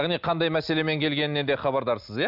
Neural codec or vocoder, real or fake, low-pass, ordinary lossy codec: none; real; 5.4 kHz; none